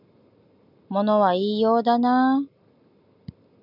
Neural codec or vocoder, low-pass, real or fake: none; 5.4 kHz; real